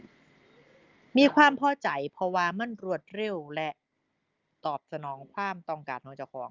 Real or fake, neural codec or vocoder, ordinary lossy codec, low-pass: real; none; Opus, 32 kbps; 7.2 kHz